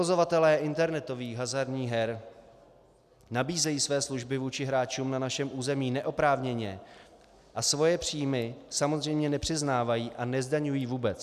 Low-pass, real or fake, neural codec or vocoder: 14.4 kHz; real; none